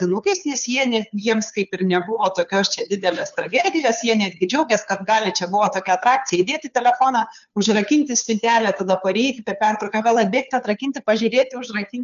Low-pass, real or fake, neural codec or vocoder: 7.2 kHz; fake; codec, 16 kHz, 2 kbps, FunCodec, trained on Chinese and English, 25 frames a second